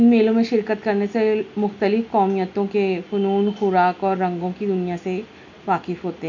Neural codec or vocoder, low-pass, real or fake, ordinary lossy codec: none; 7.2 kHz; real; none